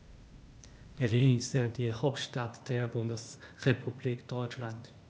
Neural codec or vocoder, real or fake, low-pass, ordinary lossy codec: codec, 16 kHz, 0.8 kbps, ZipCodec; fake; none; none